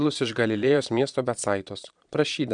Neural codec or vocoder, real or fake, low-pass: vocoder, 22.05 kHz, 80 mel bands, Vocos; fake; 9.9 kHz